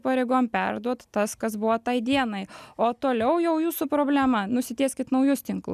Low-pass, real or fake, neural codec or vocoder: 14.4 kHz; real; none